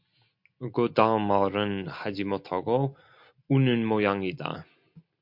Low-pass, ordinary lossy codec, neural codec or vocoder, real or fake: 5.4 kHz; MP3, 48 kbps; none; real